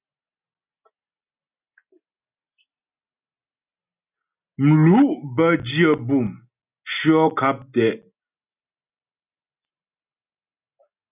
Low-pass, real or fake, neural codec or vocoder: 3.6 kHz; real; none